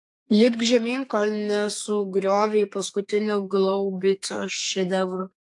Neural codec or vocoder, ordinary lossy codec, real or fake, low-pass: codec, 32 kHz, 1.9 kbps, SNAC; AAC, 64 kbps; fake; 10.8 kHz